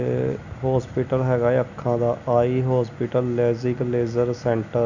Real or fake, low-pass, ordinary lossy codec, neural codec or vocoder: real; 7.2 kHz; none; none